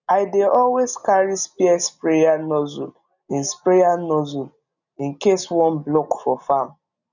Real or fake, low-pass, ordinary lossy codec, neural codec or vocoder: real; 7.2 kHz; none; none